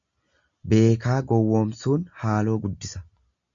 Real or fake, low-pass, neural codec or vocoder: real; 7.2 kHz; none